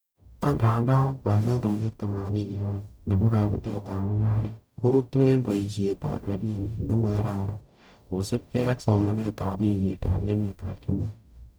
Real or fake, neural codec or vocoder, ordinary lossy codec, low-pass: fake; codec, 44.1 kHz, 0.9 kbps, DAC; none; none